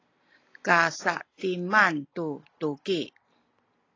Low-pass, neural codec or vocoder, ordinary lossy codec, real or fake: 7.2 kHz; none; AAC, 32 kbps; real